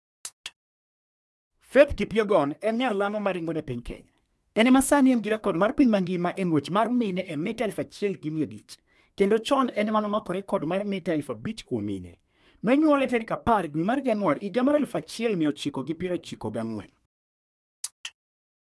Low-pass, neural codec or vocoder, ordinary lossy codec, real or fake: none; codec, 24 kHz, 1 kbps, SNAC; none; fake